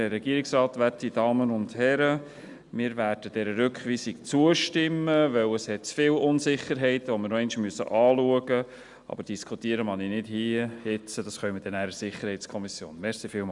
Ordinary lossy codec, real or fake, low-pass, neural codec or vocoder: Opus, 64 kbps; real; 10.8 kHz; none